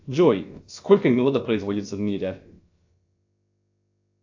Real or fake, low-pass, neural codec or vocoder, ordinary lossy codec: fake; 7.2 kHz; codec, 16 kHz, about 1 kbps, DyCAST, with the encoder's durations; AAC, 48 kbps